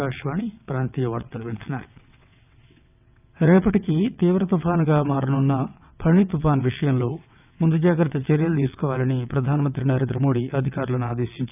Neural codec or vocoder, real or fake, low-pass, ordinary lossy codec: vocoder, 22.05 kHz, 80 mel bands, WaveNeXt; fake; 3.6 kHz; none